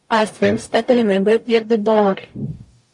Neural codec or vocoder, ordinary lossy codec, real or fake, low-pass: codec, 44.1 kHz, 0.9 kbps, DAC; MP3, 48 kbps; fake; 10.8 kHz